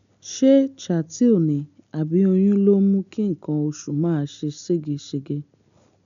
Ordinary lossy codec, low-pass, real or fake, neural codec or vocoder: none; 7.2 kHz; real; none